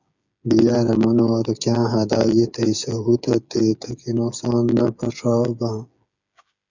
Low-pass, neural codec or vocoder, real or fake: 7.2 kHz; codec, 16 kHz, 8 kbps, FreqCodec, smaller model; fake